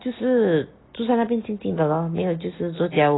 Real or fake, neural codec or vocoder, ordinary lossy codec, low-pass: real; none; AAC, 16 kbps; 7.2 kHz